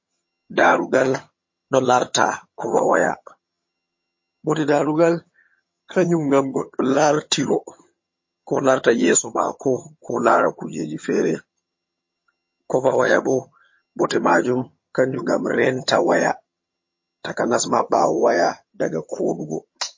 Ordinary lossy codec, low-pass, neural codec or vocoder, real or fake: MP3, 32 kbps; 7.2 kHz; vocoder, 22.05 kHz, 80 mel bands, HiFi-GAN; fake